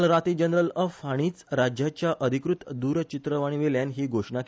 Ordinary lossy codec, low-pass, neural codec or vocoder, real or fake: none; none; none; real